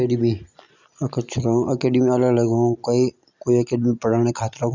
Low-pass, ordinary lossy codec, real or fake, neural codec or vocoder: 7.2 kHz; none; real; none